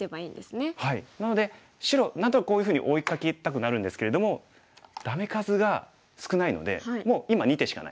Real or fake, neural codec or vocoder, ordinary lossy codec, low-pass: real; none; none; none